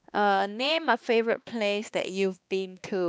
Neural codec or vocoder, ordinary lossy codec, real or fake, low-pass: codec, 16 kHz, 2 kbps, X-Codec, HuBERT features, trained on balanced general audio; none; fake; none